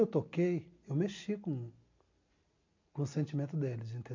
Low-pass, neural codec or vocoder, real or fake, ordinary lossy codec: 7.2 kHz; none; real; MP3, 48 kbps